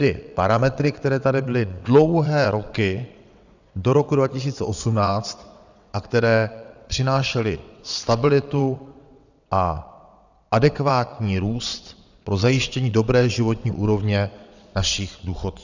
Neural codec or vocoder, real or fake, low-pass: vocoder, 22.05 kHz, 80 mel bands, WaveNeXt; fake; 7.2 kHz